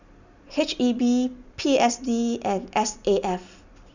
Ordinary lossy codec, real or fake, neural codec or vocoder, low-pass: none; real; none; 7.2 kHz